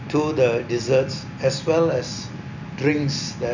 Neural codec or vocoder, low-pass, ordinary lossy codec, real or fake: none; 7.2 kHz; none; real